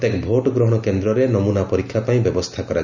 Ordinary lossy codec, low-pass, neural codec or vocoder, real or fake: none; 7.2 kHz; none; real